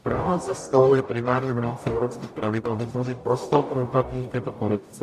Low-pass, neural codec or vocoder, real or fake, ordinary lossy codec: 14.4 kHz; codec, 44.1 kHz, 0.9 kbps, DAC; fake; AAC, 96 kbps